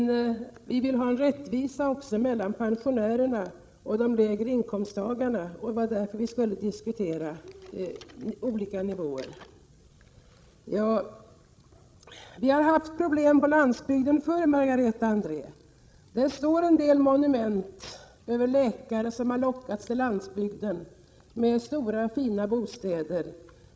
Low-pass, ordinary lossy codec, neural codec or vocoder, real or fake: none; none; codec, 16 kHz, 16 kbps, FreqCodec, larger model; fake